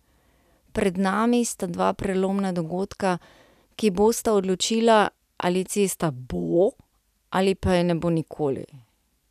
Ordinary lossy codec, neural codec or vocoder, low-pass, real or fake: none; none; 14.4 kHz; real